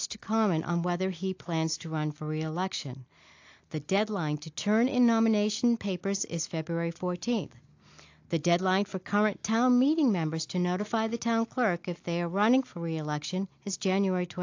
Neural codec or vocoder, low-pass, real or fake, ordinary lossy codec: none; 7.2 kHz; real; AAC, 48 kbps